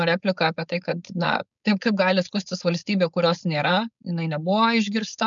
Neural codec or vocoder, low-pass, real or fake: codec, 16 kHz, 4.8 kbps, FACodec; 7.2 kHz; fake